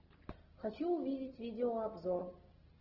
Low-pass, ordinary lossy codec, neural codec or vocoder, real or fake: 5.4 kHz; Opus, 16 kbps; none; real